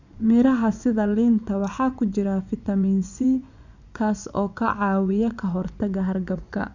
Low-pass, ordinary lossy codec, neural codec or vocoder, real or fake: 7.2 kHz; none; vocoder, 24 kHz, 100 mel bands, Vocos; fake